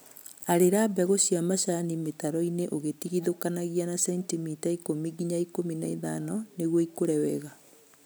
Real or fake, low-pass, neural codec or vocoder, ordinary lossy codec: real; none; none; none